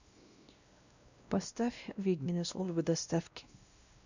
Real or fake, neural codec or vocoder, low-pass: fake; codec, 16 kHz, 1 kbps, X-Codec, WavLM features, trained on Multilingual LibriSpeech; 7.2 kHz